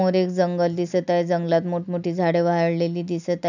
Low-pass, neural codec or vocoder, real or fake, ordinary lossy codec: 7.2 kHz; none; real; none